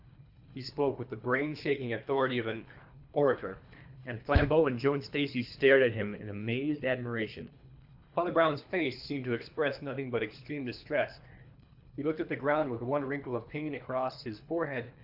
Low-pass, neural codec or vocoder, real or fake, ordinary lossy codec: 5.4 kHz; codec, 24 kHz, 3 kbps, HILCodec; fake; AAC, 48 kbps